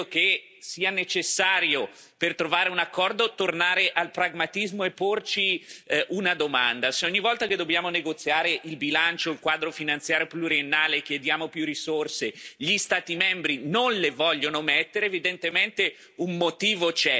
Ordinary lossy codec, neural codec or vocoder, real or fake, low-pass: none; none; real; none